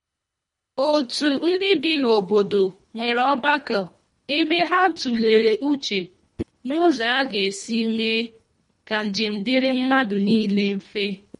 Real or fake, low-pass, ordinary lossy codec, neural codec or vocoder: fake; 10.8 kHz; MP3, 48 kbps; codec, 24 kHz, 1.5 kbps, HILCodec